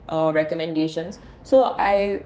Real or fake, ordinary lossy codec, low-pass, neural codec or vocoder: fake; none; none; codec, 16 kHz, 2 kbps, X-Codec, HuBERT features, trained on general audio